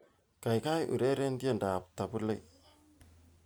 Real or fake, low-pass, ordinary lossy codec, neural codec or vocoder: real; none; none; none